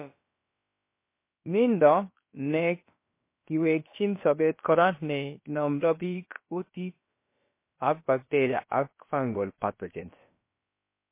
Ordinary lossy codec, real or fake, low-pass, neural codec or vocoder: MP3, 24 kbps; fake; 3.6 kHz; codec, 16 kHz, about 1 kbps, DyCAST, with the encoder's durations